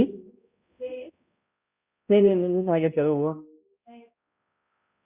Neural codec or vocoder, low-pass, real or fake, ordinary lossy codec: codec, 16 kHz, 0.5 kbps, X-Codec, HuBERT features, trained on balanced general audio; 3.6 kHz; fake; Opus, 64 kbps